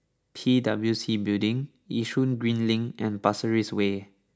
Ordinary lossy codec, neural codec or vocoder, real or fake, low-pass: none; none; real; none